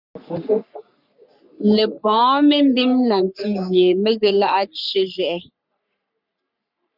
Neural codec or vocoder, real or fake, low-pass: codec, 44.1 kHz, 3.4 kbps, Pupu-Codec; fake; 5.4 kHz